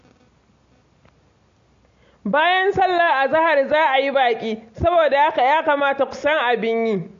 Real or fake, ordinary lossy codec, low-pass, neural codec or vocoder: real; AAC, 48 kbps; 7.2 kHz; none